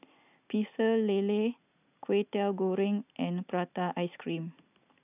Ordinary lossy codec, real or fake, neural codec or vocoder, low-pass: none; real; none; 3.6 kHz